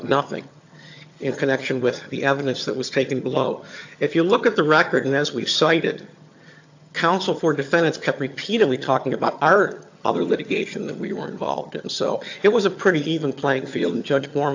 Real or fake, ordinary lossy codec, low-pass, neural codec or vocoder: fake; AAC, 48 kbps; 7.2 kHz; vocoder, 22.05 kHz, 80 mel bands, HiFi-GAN